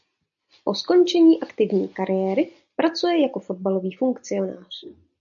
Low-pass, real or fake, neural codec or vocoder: 7.2 kHz; real; none